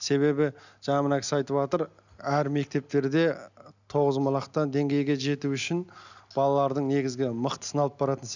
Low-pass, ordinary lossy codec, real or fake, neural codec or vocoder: 7.2 kHz; none; real; none